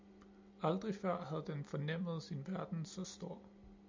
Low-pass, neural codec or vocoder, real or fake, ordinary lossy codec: 7.2 kHz; none; real; MP3, 64 kbps